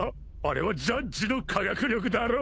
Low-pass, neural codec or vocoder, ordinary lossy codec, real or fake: none; codec, 16 kHz, 8 kbps, FunCodec, trained on Chinese and English, 25 frames a second; none; fake